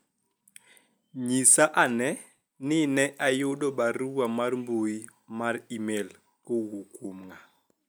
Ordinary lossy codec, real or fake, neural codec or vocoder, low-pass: none; real; none; none